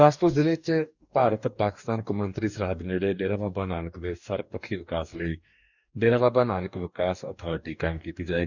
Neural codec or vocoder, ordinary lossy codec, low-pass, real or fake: codec, 44.1 kHz, 2.6 kbps, DAC; none; 7.2 kHz; fake